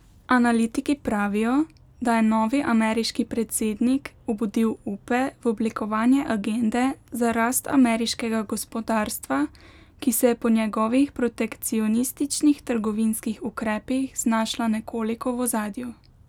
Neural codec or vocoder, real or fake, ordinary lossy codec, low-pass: none; real; none; 19.8 kHz